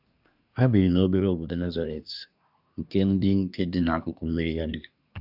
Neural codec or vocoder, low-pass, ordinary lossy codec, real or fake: codec, 24 kHz, 1 kbps, SNAC; 5.4 kHz; none; fake